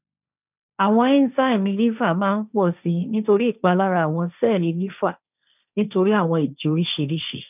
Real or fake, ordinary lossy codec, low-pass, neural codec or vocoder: fake; none; 3.6 kHz; codec, 16 kHz, 1.1 kbps, Voila-Tokenizer